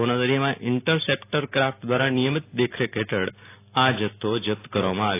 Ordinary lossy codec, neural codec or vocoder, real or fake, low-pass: AAC, 24 kbps; none; real; 3.6 kHz